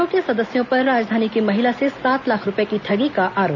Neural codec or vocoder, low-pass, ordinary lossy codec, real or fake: none; 7.2 kHz; none; real